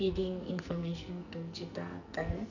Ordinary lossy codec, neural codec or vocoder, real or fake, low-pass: none; codec, 44.1 kHz, 2.6 kbps, SNAC; fake; 7.2 kHz